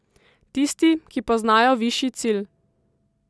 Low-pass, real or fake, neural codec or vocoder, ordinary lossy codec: none; real; none; none